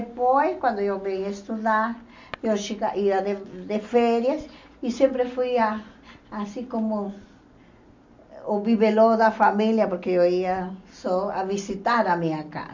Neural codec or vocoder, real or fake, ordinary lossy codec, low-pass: none; real; MP3, 64 kbps; 7.2 kHz